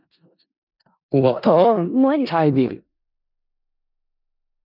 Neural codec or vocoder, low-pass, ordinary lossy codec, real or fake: codec, 16 kHz in and 24 kHz out, 0.4 kbps, LongCat-Audio-Codec, four codebook decoder; 5.4 kHz; none; fake